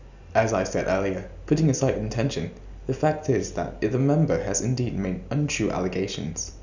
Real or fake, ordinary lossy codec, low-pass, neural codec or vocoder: real; none; 7.2 kHz; none